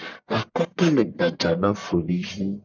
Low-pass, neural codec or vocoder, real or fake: 7.2 kHz; codec, 44.1 kHz, 1.7 kbps, Pupu-Codec; fake